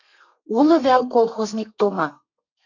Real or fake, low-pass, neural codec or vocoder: fake; 7.2 kHz; codec, 32 kHz, 1.9 kbps, SNAC